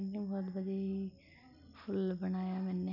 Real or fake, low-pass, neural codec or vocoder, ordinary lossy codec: real; 5.4 kHz; none; none